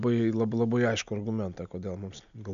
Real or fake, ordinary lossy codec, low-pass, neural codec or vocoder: real; MP3, 64 kbps; 7.2 kHz; none